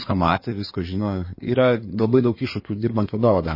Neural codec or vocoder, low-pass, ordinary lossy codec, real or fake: codec, 16 kHz in and 24 kHz out, 2.2 kbps, FireRedTTS-2 codec; 5.4 kHz; MP3, 24 kbps; fake